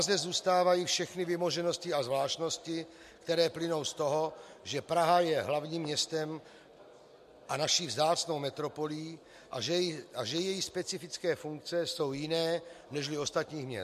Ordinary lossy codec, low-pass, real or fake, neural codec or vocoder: MP3, 64 kbps; 14.4 kHz; real; none